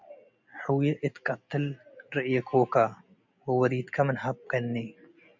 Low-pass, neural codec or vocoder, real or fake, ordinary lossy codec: 7.2 kHz; none; real; MP3, 64 kbps